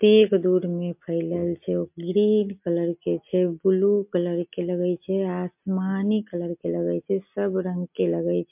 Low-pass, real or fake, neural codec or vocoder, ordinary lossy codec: 3.6 kHz; real; none; MP3, 32 kbps